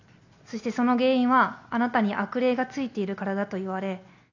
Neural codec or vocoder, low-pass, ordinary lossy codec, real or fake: none; 7.2 kHz; none; real